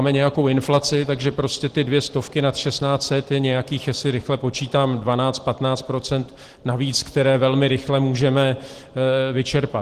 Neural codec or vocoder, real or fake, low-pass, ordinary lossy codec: none; real; 14.4 kHz; Opus, 16 kbps